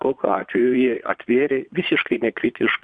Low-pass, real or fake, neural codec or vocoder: 9.9 kHz; fake; vocoder, 22.05 kHz, 80 mel bands, Vocos